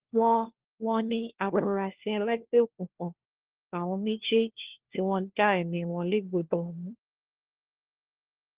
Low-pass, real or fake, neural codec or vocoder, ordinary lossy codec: 3.6 kHz; fake; codec, 16 kHz, 1 kbps, FunCodec, trained on LibriTTS, 50 frames a second; Opus, 16 kbps